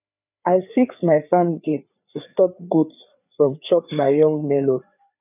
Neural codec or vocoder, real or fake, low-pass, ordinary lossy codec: codec, 16 kHz, 4 kbps, FreqCodec, larger model; fake; 3.6 kHz; none